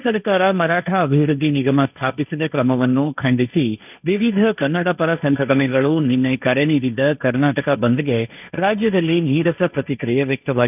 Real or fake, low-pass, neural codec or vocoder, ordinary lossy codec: fake; 3.6 kHz; codec, 16 kHz, 1.1 kbps, Voila-Tokenizer; none